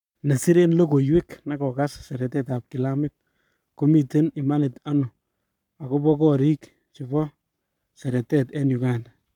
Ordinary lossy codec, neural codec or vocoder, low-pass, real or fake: none; codec, 44.1 kHz, 7.8 kbps, Pupu-Codec; 19.8 kHz; fake